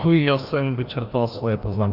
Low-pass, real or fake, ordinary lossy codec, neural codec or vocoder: 5.4 kHz; fake; Opus, 64 kbps; codec, 16 kHz, 1 kbps, FreqCodec, larger model